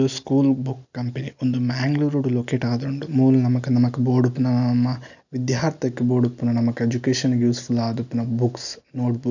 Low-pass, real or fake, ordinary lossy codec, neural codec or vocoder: 7.2 kHz; real; none; none